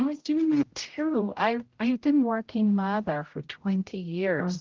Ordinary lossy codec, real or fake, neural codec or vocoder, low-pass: Opus, 16 kbps; fake; codec, 16 kHz, 0.5 kbps, X-Codec, HuBERT features, trained on general audio; 7.2 kHz